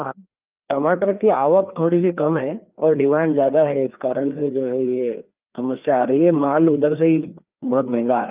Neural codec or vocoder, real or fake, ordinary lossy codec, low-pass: codec, 16 kHz, 2 kbps, FreqCodec, larger model; fake; Opus, 24 kbps; 3.6 kHz